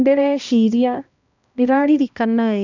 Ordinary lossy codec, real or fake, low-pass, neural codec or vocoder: none; fake; 7.2 kHz; codec, 16 kHz, 1 kbps, X-Codec, HuBERT features, trained on balanced general audio